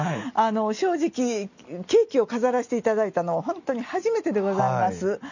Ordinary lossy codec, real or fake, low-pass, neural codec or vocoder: none; real; 7.2 kHz; none